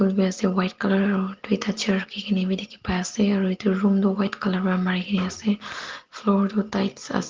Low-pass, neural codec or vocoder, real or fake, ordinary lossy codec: 7.2 kHz; none; real; Opus, 16 kbps